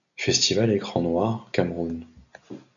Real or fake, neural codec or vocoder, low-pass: real; none; 7.2 kHz